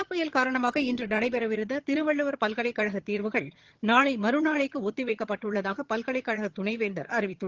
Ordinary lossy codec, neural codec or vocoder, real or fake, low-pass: Opus, 16 kbps; vocoder, 22.05 kHz, 80 mel bands, HiFi-GAN; fake; 7.2 kHz